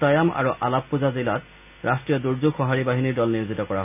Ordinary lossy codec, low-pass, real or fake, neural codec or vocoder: none; 3.6 kHz; real; none